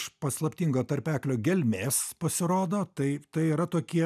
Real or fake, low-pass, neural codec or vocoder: real; 14.4 kHz; none